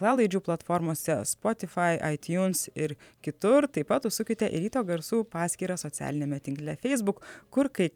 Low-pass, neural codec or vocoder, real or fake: 19.8 kHz; vocoder, 44.1 kHz, 128 mel bands every 512 samples, BigVGAN v2; fake